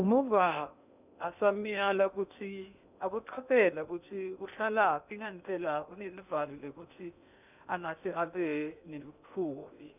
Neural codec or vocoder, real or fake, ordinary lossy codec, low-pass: codec, 16 kHz in and 24 kHz out, 0.8 kbps, FocalCodec, streaming, 65536 codes; fake; none; 3.6 kHz